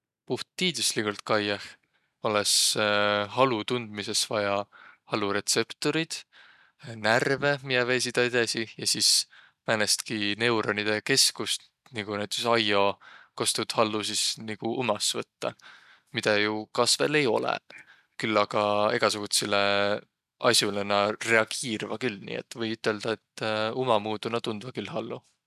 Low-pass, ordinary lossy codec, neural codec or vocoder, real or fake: 14.4 kHz; none; none; real